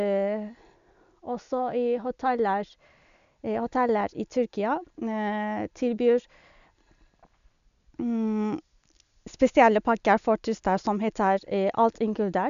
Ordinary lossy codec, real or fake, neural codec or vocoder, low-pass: none; real; none; 7.2 kHz